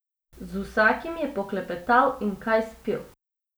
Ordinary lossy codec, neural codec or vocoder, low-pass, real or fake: none; none; none; real